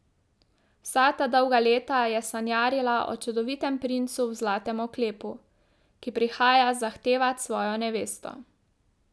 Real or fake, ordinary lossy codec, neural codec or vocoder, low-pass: real; none; none; none